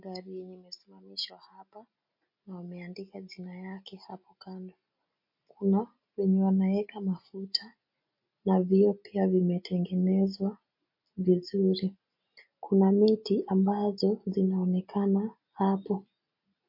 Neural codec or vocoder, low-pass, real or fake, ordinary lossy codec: none; 5.4 kHz; real; MP3, 32 kbps